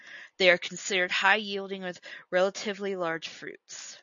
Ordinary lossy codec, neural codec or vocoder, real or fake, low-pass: MP3, 96 kbps; none; real; 7.2 kHz